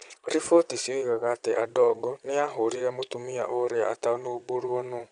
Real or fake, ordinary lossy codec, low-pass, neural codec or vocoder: fake; none; 9.9 kHz; vocoder, 22.05 kHz, 80 mel bands, Vocos